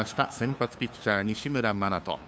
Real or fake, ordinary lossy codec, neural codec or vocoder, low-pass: fake; none; codec, 16 kHz, 2 kbps, FunCodec, trained on LibriTTS, 25 frames a second; none